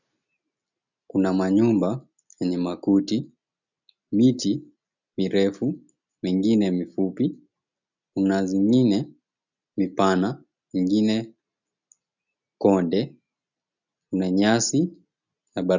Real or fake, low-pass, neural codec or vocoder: real; 7.2 kHz; none